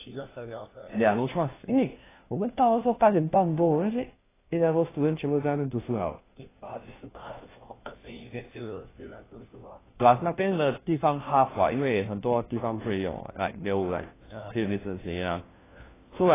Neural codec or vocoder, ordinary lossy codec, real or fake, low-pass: codec, 16 kHz, 1 kbps, FunCodec, trained on LibriTTS, 50 frames a second; AAC, 16 kbps; fake; 3.6 kHz